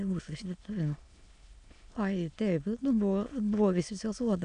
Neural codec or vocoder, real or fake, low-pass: autoencoder, 22.05 kHz, a latent of 192 numbers a frame, VITS, trained on many speakers; fake; 9.9 kHz